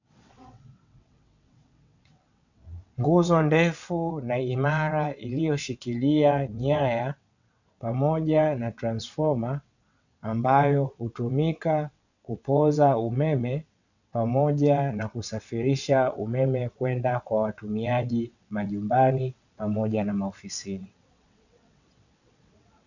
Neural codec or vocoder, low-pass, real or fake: vocoder, 22.05 kHz, 80 mel bands, WaveNeXt; 7.2 kHz; fake